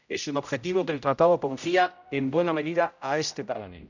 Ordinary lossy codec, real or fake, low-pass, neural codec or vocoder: none; fake; 7.2 kHz; codec, 16 kHz, 0.5 kbps, X-Codec, HuBERT features, trained on general audio